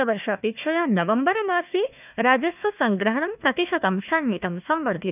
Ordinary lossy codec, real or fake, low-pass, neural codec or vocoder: none; fake; 3.6 kHz; codec, 16 kHz, 1 kbps, FunCodec, trained on Chinese and English, 50 frames a second